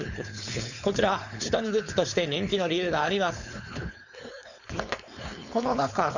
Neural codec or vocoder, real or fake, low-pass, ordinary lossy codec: codec, 16 kHz, 4.8 kbps, FACodec; fake; 7.2 kHz; none